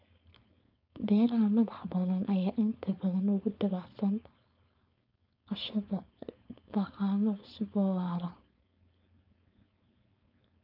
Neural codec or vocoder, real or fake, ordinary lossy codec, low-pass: codec, 16 kHz, 4.8 kbps, FACodec; fake; none; 5.4 kHz